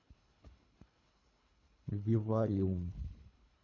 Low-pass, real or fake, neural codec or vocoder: 7.2 kHz; fake; codec, 24 kHz, 3 kbps, HILCodec